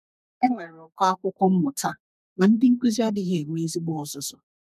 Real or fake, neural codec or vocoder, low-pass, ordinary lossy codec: fake; codec, 32 kHz, 1.9 kbps, SNAC; 14.4 kHz; none